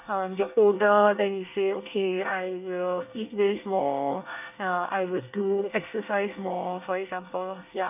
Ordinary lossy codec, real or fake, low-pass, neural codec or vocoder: none; fake; 3.6 kHz; codec, 24 kHz, 1 kbps, SNAC